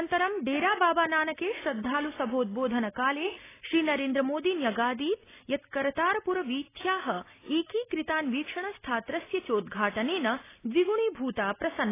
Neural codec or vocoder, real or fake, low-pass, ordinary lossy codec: none; real; 3.6 kHz; AAC, 16 kbps